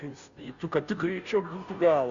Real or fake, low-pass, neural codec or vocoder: fake; 7.2 kHz; codec, 16 kHz, 0.5 kbps, FunCodec, trained on Chinese and English, 25 frames a second